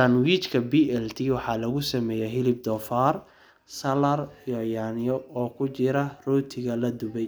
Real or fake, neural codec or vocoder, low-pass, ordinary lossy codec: real; none; none; none